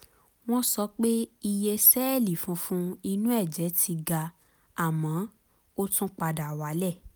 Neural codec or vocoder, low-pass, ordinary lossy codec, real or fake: none; none; none; real